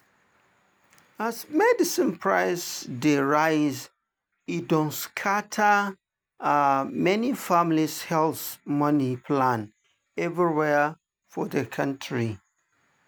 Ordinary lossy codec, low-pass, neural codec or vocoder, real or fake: none; none; none; real